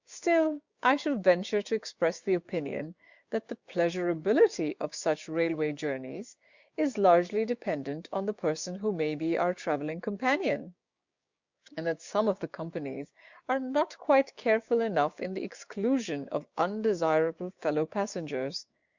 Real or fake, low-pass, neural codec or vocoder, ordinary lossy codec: fake; 7.2 kHz; codec, 16 kHz, 6 kbps, DAC; Opus, 64 kbps